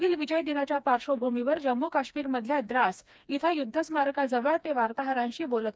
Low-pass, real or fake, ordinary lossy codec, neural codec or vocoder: none; fake; none; codec, 16 kHz, 2 kbps, FreqCodec, smaller model